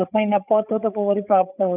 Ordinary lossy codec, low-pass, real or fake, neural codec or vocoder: none; 3.6 kHz; fake; codec, 16 kHz, 16 kbps, FreqCodec, larger model